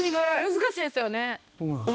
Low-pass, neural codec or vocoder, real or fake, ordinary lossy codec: none; codec, 16 kHz, 1 kbps, X-Codec, HuBERT features, trained on balanced general audio; fake; none